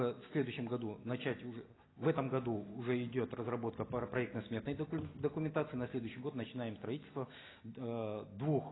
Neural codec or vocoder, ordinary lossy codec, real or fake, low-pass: none; AAC, 16 kbps; real; 7.2 kHz